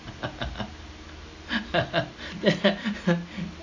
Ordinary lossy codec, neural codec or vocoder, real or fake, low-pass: AAC, 48 kbps; none; real; 7.2 kHz